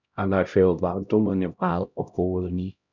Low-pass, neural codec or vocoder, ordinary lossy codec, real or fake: 7.2 kHz; codec, 16 kHz, 0.5 kbps, X-Codec, HuBERT features, trained on LibriSpeech; none; fake